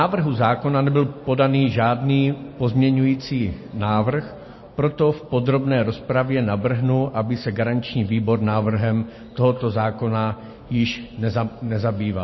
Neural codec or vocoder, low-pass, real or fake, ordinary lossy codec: none; 7.2 kHz; real; MP3, 24 kbps